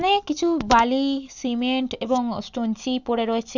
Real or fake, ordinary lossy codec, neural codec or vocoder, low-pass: real; none; none; 7.2 kHz